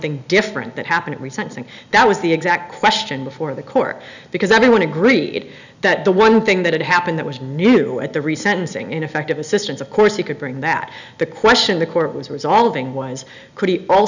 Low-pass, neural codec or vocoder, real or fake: 7.2 kHz; none; real